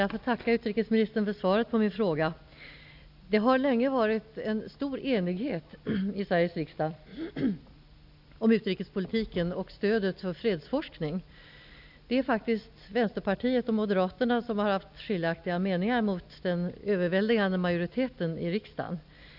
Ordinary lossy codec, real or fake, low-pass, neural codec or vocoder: none; real; 5.4 kHz; none